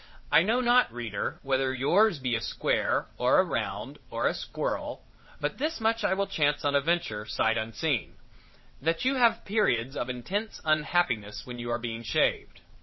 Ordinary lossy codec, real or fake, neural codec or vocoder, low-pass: MP3, 24 kbps; fake; vocoder, 22.05 kHz, 80 mel bands, WaveNeXt; 7.2 kHz